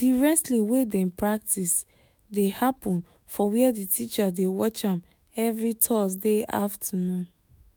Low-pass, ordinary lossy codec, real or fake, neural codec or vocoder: none; none; fake; autoencoder, 48 kHz, 128 numbers a frame, DAC-VAE, trained on Japanese speech